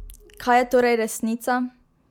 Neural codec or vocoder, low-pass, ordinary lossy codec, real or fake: none; 19.8 kHz; MP3, 96 kbps; real